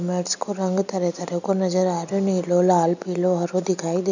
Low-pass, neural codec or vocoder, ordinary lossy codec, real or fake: 7.2 kHz; none; none; real